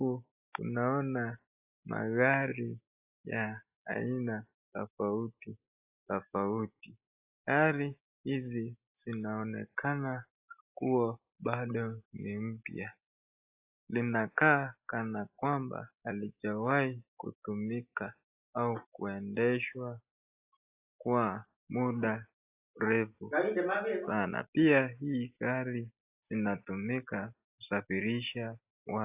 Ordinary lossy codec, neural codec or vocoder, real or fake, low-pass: AAC, 32 kbps; none; real; 3.6 kHz